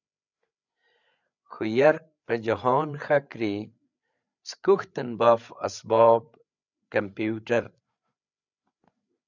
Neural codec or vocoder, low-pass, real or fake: codec, 16 kHz, 8 kbps, FreqCodec, larger model; 7.2 kHz; fake